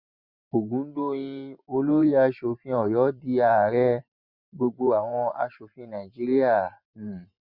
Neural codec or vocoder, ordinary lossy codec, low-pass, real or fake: vocoder, 44.1 kHz, 128 mel bands every 512 samples, BigVGAN v2; Opus, 64 kbps; 5.4 kHz; fake